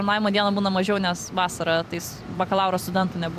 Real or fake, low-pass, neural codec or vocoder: fake; 14.4 kHz; vocoder, 44.1 kHz, 128 mel bands every 256 samples, BigVGAN v2